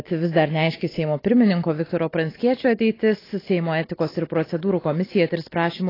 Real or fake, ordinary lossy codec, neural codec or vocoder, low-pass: real; AAC, 24 kbps; none; 5.4 kHz